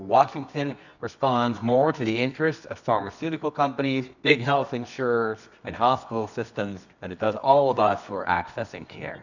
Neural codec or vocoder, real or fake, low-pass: codec, 24 kHz, 0.9 kbps, WavTokenizer, medium music audio release; fake; 7.2 kHz